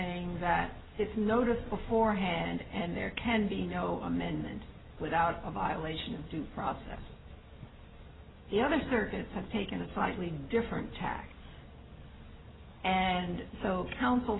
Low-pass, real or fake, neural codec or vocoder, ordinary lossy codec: 7.2 kHz; real; none; AAC, 16 kbps